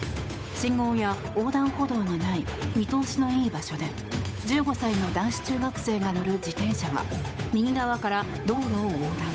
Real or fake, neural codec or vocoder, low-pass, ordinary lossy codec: fake; codec, 16 kHz, 8 kbps, FunCodec, trained on Chinese and English, 25 frames a second; none; none